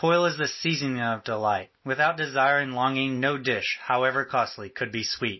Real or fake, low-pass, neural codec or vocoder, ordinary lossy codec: real; 7.2 kHz; none; MP3, 24 kbps